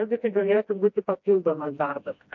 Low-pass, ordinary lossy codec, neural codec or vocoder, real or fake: 7.2 kHz; MP3, 48 kbps; codec, 16 kHz, 1 kbps, FreqCodec, smaller model; fake